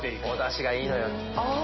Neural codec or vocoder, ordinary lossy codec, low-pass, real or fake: none; MP3, 24 kbps; 7.2 kHz; real